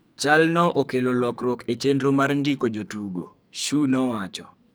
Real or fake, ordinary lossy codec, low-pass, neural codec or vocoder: fake; none; none; codec, 44.1 kHz, 2.6 kbps, SNAC